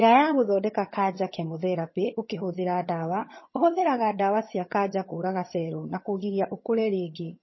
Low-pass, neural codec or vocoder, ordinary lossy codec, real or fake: 7.2 kHz; vocoder, 22.05 kHz, 80 mel bands, HiFi-GAN; MP3, 24 kbps; fake